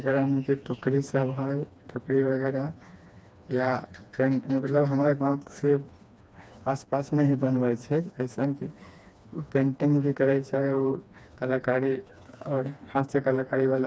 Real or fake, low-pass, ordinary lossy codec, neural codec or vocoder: fake; none; none; codec, 16 kHz, 2 kbps, FreqCodec, smaller model